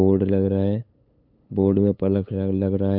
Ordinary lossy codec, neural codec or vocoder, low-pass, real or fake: none; codec, 16 kHz, 8 kbps, FunCodec, trained on LibriTTS, 25 frames a second; 5.4 kHz; fake